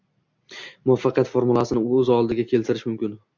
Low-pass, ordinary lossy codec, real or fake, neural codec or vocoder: 7.2 kHz; MP3, 64 kbps; real; none